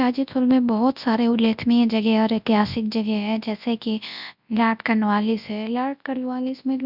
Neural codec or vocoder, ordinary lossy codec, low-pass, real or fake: codec, 24 kHz, 0.9 kbps, WavTokenizer, large speech release; none; 5.4 kHz; fake